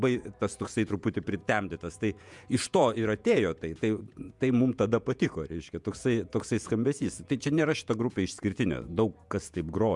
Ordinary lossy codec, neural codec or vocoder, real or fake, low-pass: MP3, 96 kbps; vocoder, 48 kHz, 128 mel bands, Vocos; fake; 10.8 kHz